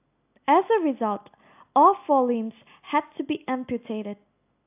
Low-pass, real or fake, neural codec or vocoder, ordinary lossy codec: 3.6 kHz; real; none; AAC, 32 kbps